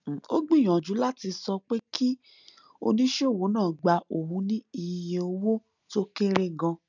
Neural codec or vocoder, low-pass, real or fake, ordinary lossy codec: none; 7.2 kHz; real; none